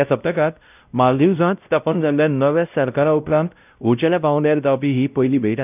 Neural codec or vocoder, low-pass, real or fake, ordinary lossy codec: codec, 16 kHz, 0.5 kbps, X-Codec, WavLM features, trained on Multilingual LibriSpeech; 3.6 kHz; fake; none